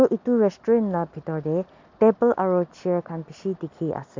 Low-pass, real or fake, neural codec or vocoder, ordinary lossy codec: 7.2 kHz; real; none; MP3, 64 kbps